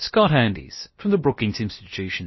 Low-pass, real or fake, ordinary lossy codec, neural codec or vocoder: 7.2 kHz; fake; MP3, 24 kbps; codec, 16 kHz, about 1 kbps, DyCAST, with the encoder's durations